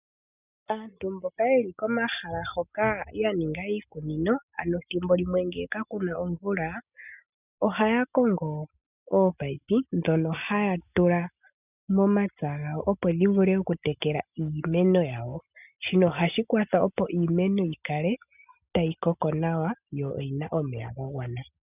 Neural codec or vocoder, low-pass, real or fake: none; 3.6 kHz; real